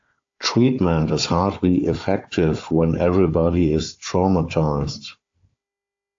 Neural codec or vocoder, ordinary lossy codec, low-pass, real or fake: codec, 16 kHz, 4 kbps, FunCodec, trained on Chinese and English, 50 frames a second; AAC, 48 kbps; 7.2 kHz; fake